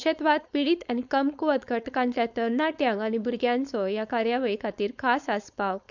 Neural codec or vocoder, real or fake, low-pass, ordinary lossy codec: codec, 16 kHz, 4.8 kbps, FACodec; fake; 7.2 kHz; none